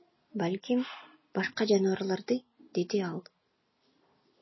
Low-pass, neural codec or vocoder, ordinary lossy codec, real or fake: 7.2 kHz; none; MP3, 24 kbps; real